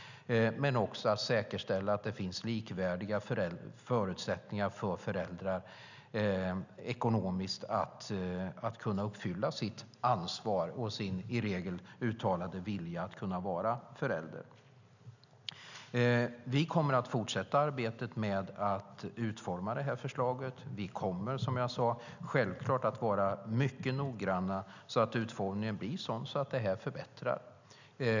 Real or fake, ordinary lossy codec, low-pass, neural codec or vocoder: real; none; 7.2 kHz; none